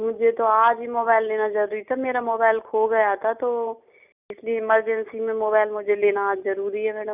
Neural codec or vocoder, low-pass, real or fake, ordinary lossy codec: none; 3.6 kHz; real; none